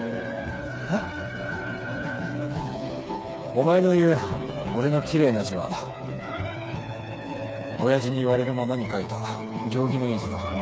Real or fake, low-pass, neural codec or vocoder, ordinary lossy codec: fake; none; codec, 16 kHz, 4 kbps, FreqCodec, smaller model; none